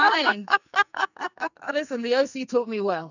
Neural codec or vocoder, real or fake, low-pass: codec, 44.1 kHz, 2.6 kbps, SNAC; fake; 7.2 kHz